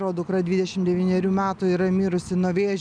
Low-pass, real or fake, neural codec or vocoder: 9.9 kHz; real; none